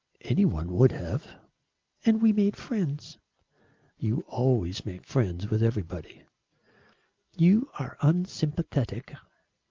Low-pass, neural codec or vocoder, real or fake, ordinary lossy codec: 7.2 kHz; none; real; Opus, 32 kbps